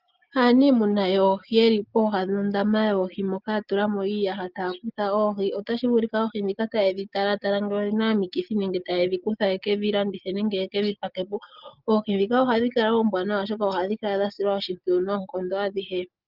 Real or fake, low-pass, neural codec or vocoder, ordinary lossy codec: real; 5.4 kHz; none; Opus, 32 kbps